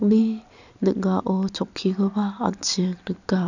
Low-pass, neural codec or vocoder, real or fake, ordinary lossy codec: 7.2 kHz; none; real; none